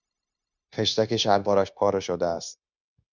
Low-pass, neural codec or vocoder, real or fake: 7.2 kHz; codec, 16 kHz, 0.9 kbps, LongCat-Audio-Codec; fake